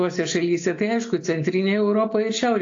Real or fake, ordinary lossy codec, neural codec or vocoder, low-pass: real; AAC, 48 kbps; none; 7.2 kHz